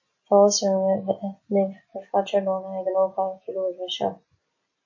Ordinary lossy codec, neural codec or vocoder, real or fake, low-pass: MP3, 32 kbps; none; real; 7.2 kHz